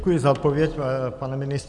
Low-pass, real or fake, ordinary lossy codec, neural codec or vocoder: 10.8 kHz; real; Opus, 64 kbps; none